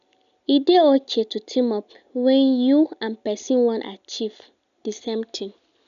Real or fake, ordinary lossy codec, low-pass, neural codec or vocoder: real; none; 7.2 kHz; none